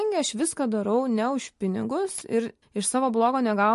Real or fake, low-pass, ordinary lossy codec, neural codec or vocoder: real; 14.4 kHz; MP3, 48 kbps; none